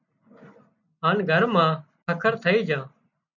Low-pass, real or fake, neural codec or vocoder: 7.2 kHz; real; none